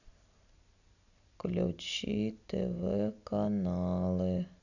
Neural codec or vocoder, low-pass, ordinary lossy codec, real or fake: none; 7.2 kHz; none; real